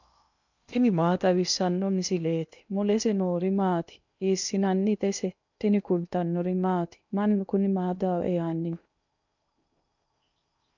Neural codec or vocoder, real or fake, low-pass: codec, 16 kHz in and 24 kHz out, 0.8 kbps, FocalCodec, streaming, 65536 codes; fake; 7.2 kHz